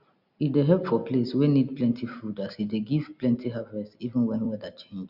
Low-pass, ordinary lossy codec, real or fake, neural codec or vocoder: 5.4 kHz; Opus, 64 kbps; real; none